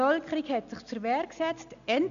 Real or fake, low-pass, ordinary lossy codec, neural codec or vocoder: real; 7.2 kHz; none; none